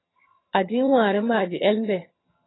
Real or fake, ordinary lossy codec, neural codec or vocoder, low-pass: fake; AAC, 16 kbps; vocoder, 22.05 kHz, 80 mel bands, HiFi-GAN; 7.2 kHz